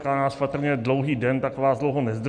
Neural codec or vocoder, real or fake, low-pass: none; real; 9.9 kHz